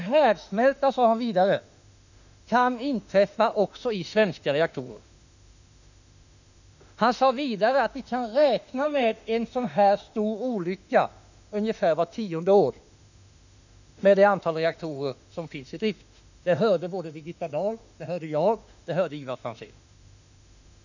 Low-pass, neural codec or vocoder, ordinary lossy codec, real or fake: 7.2 kHz; autoencoder, 48 kHz, 32 numbers a frame, DAC-VAE, trained on Japanese speech; none; fake